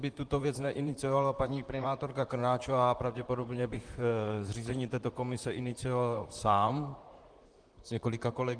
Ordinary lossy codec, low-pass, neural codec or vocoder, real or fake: Opus, 24 kbps; 9.9 kHz; vocoder, 44.1 kHz, 128 mel bands, Pupu-Vocoder; fake